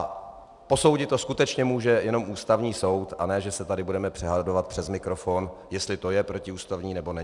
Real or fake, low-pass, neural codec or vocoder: real; 10.8 kHz; none